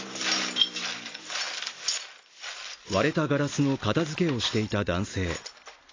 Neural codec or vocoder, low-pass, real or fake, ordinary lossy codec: none; 7.2 kHz; real; AAC, 32 kbps